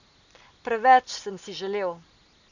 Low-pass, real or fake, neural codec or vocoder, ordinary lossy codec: 7.2 kHz; real; none; none